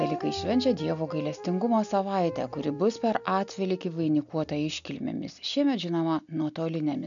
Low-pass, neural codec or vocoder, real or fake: 7.2 kHz; none; real